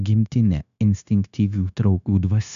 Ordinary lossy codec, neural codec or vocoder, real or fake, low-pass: MP3, 96 kbps; codec, 16 kHz, 0.9 kbps, LongCat-Audio-Codec; fake; 7.2 kHz